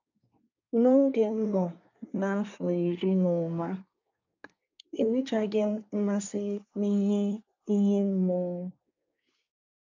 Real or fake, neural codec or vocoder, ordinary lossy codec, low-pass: fake; codec, 24 kHz, 1 kbps, SNAC; none; 7.2 kHz